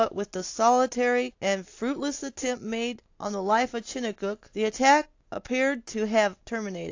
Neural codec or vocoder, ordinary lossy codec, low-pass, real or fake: none; AAC, 48 kbps; 7.2 kHz; real